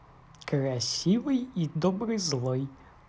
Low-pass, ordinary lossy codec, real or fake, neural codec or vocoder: none; none; real; none